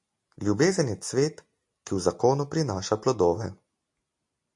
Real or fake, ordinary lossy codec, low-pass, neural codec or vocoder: real; MP3, 48 kbps; 10.8 kHz; none